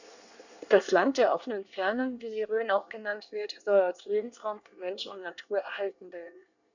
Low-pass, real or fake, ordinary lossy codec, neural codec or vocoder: 7.2 kHz; fake; none; codec, 24 kHz, 1 kbps, SNAC